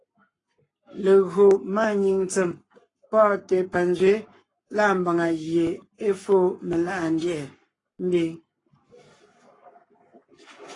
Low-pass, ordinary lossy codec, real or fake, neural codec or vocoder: 10.8 kHz; AAC, 32 kbps; fake; codec, 44.1 kHz, 7.8 kbps, Pupu-Codec